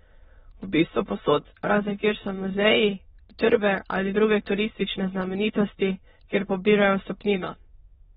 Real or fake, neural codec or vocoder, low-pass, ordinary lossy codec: fake; autoencoder, 22.05 kHz, a latent of 192 numbers a frame, VITS, trained on many speakers; 9.9 kHz; AAC, 16 kbps